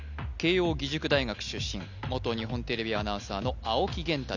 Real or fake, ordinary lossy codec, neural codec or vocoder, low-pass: real; none; none; 7.2 kHz